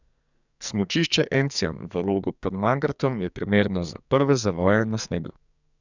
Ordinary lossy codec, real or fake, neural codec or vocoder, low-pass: none; fake; codec, 44.1 kHz, 2.6 kbps, SNAC; 7.2 kHz